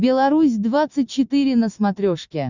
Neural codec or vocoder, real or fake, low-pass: none; real; 7.2 kHz